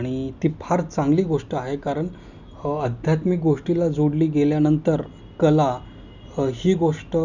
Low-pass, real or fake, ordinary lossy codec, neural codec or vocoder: 7.2 kHz; real; none; none